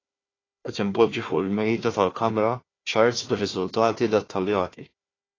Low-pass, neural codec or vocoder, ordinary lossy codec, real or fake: 7.2 kHz; codec, 16 kHz, 1 kbps, FunCodec, trained on Chinese and English, 50 frames a second; AAC, 32 kbps; fake